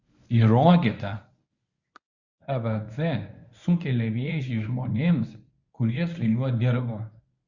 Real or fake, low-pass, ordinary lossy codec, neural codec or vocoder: fake; 7.2 kHz; Opus, 64 kbps; codec, 24 kHz, 0.9 kbps, WavTokenizer, medium speech release version 1